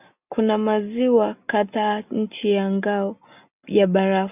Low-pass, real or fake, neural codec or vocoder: 3.6 kHz; real; none